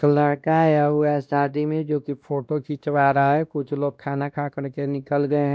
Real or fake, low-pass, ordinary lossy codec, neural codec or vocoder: fake; none; none; codec, 16 kHz, 1 kbps, X-Codec, WavLM features, trained on Multilingual LibriSpeech